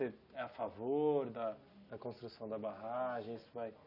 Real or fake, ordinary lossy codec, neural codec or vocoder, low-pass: real; none; none; 5.4 kHz